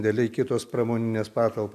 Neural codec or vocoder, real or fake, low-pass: none; real; 14.4 kHz